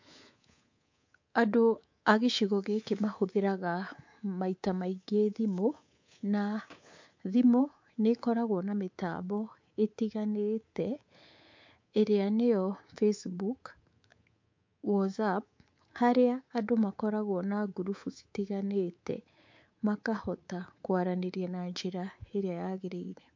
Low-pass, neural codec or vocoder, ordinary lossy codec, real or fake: 7.2 kHz; codec, 24 kHz, 3.1 kbps, DualCodec; MP3, 48 kbps; fake